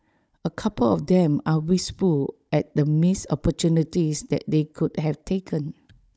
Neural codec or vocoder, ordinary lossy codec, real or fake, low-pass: codec, 16 kHz, 16 kbps, FunCodec, trained on Chinese and English, 50 frames a second; none; fake; none